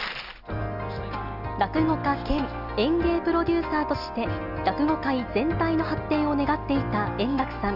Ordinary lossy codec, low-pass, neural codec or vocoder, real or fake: none; 5.4 kHz; none; real